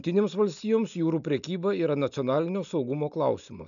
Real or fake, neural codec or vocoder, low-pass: real; none; 7.2 kHz